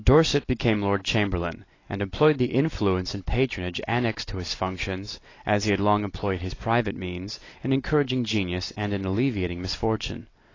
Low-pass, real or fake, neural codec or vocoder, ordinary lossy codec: 7.2 kHz; real; none; AAC, 32 kbps